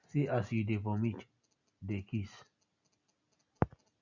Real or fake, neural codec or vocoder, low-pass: real; none; 7.2 kHz